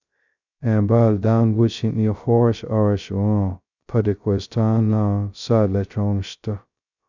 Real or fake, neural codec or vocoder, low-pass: fake; codec, 16 kHz, 0.2 kbps, FocalCodec; 7.2 kHz